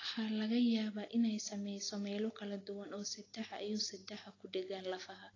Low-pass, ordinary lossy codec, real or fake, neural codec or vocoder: 7.2 kHz; AAC, 32 kbps; real; none